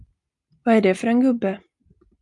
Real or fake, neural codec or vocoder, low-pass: real; none; 10.8 kHz